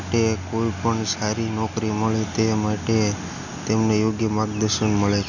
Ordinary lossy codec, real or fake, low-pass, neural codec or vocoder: none; real; 7.2 kHz; none